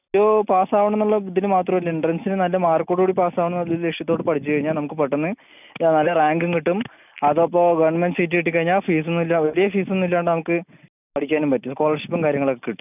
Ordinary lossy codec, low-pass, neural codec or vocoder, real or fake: none; 3.6 kHz; none; real